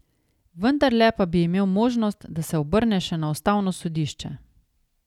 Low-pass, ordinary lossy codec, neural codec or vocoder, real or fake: 19.8 kHz; none; none; real